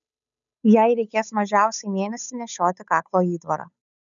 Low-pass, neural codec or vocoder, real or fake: 7.2 kHz; codec, 16 kHz, 8 kbps, FunCodec, trained on Chinese and English, 25 frames a second; fake